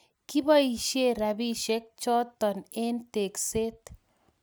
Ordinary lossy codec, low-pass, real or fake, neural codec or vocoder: none; none; real; none